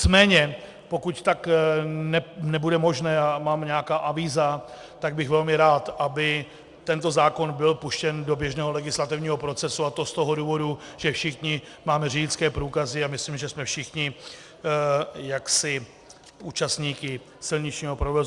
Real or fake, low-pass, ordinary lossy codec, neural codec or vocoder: real; 10.8 kHz; Opus, 64 kbps; none